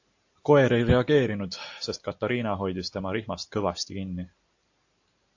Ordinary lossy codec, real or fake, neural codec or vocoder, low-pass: AAC, 48 kbps; real; none; 7.2 kHz